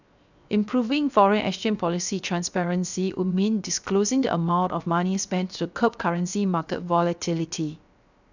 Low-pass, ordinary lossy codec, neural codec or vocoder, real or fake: 7.2 kHz; none; codec, 16 kHz, 0.7 kbps, FocalCodec; fake